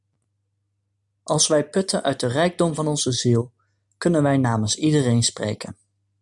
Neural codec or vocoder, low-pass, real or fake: none; 10.8 kHz; real